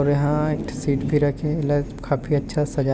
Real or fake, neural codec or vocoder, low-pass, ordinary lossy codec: real; none; none; none